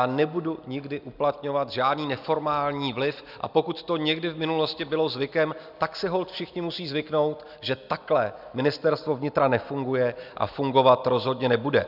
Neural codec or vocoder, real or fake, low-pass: none; real; 5.4 kHz